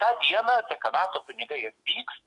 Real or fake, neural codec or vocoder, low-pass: fake; codec, 44.1 kHz, 7.8 kbps, DAC; 10.8 kHz